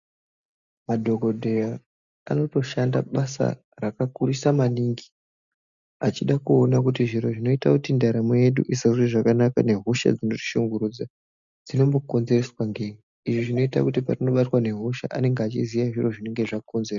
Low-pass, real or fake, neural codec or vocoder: 7.2 kHz; real; none